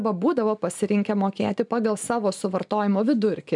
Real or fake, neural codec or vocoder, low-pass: real; none; 10.8 kHz